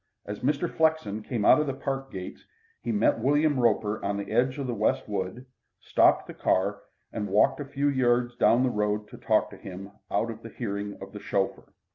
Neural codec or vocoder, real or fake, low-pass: none; real; 7.2 kHz